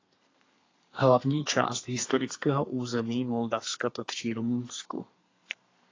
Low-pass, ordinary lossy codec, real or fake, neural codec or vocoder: 7.2 kHz; AAC, 32 kbps; fake; codec, 32 kHz, 1.9 kbps, SNAC